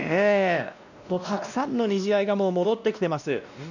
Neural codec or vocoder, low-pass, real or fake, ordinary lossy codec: codec, 16 kHz, 1 kbps, X-Codec, WavLM features, trained on Multilingual LibriSpeech; 7.2 kHz; fake; none